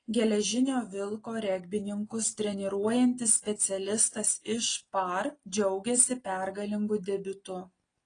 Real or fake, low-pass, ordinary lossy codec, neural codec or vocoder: real; 9.9 kHz; AAC, 32 kbps; none